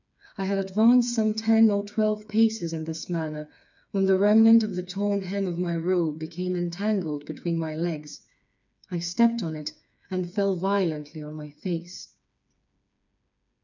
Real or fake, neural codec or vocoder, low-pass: fake; codec, 16 kHz, 4 kbps, FreqCodec, smaller model; 7.2 kHz